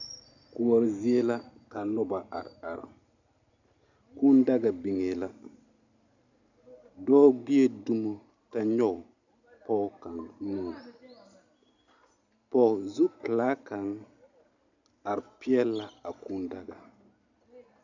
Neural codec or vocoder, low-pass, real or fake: none; 7.2 kHz; real